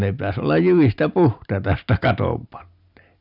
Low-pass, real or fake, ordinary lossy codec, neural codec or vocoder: 5.4 kHz; real; none; none